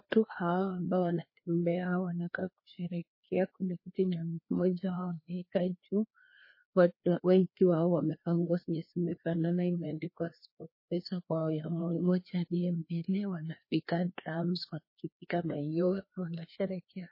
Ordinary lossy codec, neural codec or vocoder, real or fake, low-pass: MP3, 24 kbps; codec, 16 kHz, 2 kbps, FreqCodec, larger model; fake; 5.4 kHz